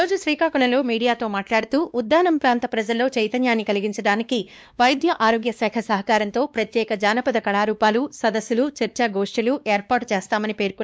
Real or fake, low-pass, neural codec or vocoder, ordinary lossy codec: fake; none; codec, 16 kHz, 2 kbps, X-Codec, WavLM features, trained on Multilingual LibriSpeech; none